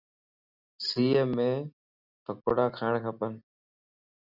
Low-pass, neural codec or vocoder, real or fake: 5.4 kHz; none; real